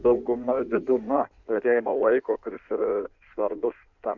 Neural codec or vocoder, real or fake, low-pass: codec, 16 kHz in and 24 kHz out, 1.1 kbps, FireRedTTS-2 codec; fake; 7.2 kHz